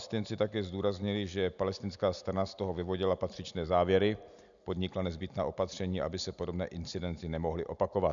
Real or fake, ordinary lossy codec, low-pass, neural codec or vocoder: real; MP3, 96 kbps; 7.2 kHz; none